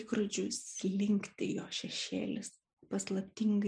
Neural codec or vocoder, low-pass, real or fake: none; 9.9 kHz; real